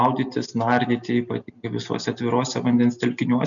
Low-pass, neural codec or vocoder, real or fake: 7.2 kHz; none; real